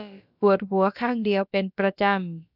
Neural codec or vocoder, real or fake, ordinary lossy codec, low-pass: codec, 16 kHz, about 1 kbps, DyCAST, with the encoder's durations; fake; none; 5.4 kHz